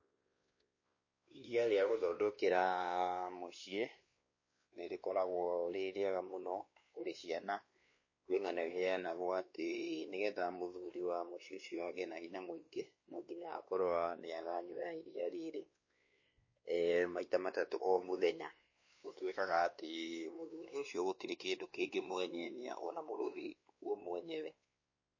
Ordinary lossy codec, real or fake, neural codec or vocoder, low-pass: MP3, 32 kbps; fake; codec, 16 kHz, 2 kbps, X-Codec, WavLM features, trained on Multilingual LibriSpeech; 7.2 kHz